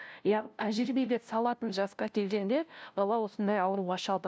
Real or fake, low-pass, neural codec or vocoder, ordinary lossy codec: fake; none; codec, 16 kHz, 0.5 kbps, FunCodec, trained on LibriTTS, 25 frames a second; none